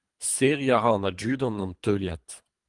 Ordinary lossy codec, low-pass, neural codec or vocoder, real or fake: Opus, 32 kbps; 10.8 kHz; codec, 24 kHz, 3 kbps, HILCodec; fake